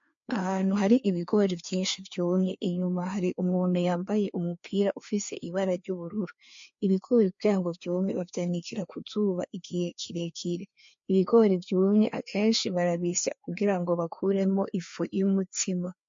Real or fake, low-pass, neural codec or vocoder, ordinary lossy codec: fake; 7.2 kHz; codec, 16 kHz, 2 kbps, FreqCodec, larger model; MP3, 48 kbps